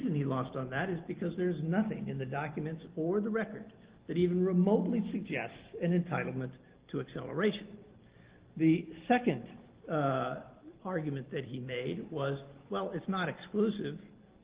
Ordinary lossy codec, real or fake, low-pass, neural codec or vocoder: Opus, 16 kbps; real; 3.6 kHz; none